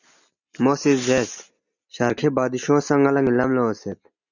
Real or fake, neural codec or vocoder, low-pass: real; none; 7.2 kHz